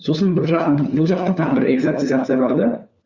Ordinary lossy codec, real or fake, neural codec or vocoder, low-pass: Opus, 64 kbps; fake; codec, 16 kHz, 4 kbps, FunCodec, trained on LibriTTS, 50 frames a second; 7.2 kHz